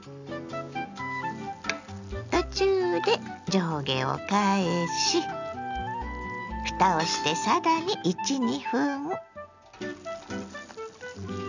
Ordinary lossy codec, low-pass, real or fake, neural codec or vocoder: none; 7.2 kHz; real; none